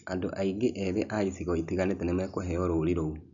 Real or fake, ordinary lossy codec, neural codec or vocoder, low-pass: real; none; none; 7.2 kHz